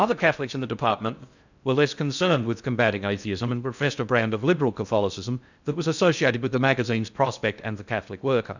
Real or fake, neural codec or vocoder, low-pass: fake; codec, 16 kHz in and 24 kHz out, 0.6 kbps, FocalCodec, streaming, 4096 codes; 7.2 kHz